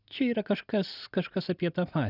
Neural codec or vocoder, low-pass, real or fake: none; 5.4 kHz; real